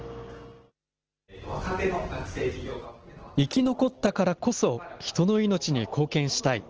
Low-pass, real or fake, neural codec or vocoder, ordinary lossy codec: 7.2 kHz; real; none; Opus, 16 kbps